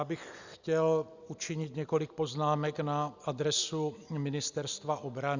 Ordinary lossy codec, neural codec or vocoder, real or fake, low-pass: Opus, 64 kbps; none; real; 7.2 kHz